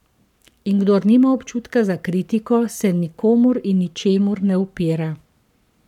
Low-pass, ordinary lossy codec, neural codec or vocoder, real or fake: 19.8 kHz; none; codec, 44.1 kHz, 7.8 kbps, Pupu-Codec; fake